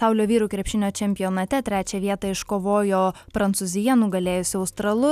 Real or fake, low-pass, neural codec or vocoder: real; 14.4 kHz; none